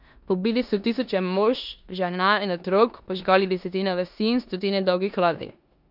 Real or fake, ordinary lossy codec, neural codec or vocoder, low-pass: fake; none; codec, 16 kHz in and 24 kHz out, 0.9 kbps, LongCat-Audio-Codec, four codebook decoder; 5.4 kHz